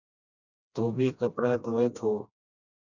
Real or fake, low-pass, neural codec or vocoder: fake; 7.2 kHz; codec, 16 kHz, 1 kbps, FreqCodec, smaller model